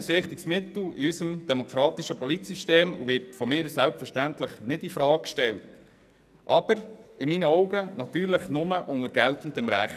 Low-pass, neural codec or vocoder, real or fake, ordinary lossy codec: 14.4 kHz; codec, 44.1 kHz, 2.6 kbps, SNAC; fake; none